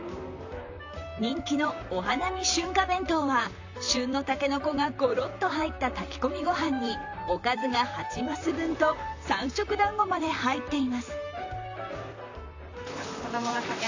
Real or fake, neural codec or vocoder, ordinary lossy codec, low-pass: fake; vocoder, 44.1 kHz, 128 mel bands, Pupu-Vocoder; AAC, 48 kbps; 7.2 kHz